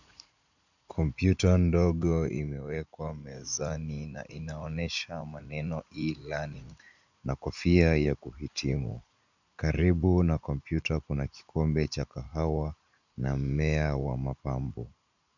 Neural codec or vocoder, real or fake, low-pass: vocoder, 44.1 kHz, 80 mel bands, Vocos; fake; 7.2 kHz